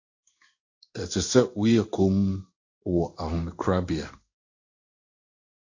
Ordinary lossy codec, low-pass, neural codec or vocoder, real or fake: AAC, 48 kbps; 7.2 kHz; codec, 16 kHz in and 24 kHz out, 1 kbps, XY-Tokenizer; fake